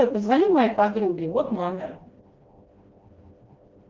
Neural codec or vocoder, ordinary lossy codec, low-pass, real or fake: codec, 16 kHz, 1 kbps, FreqCodec, smaller model; Opus, 32 kbps; 7.2 kHz; fake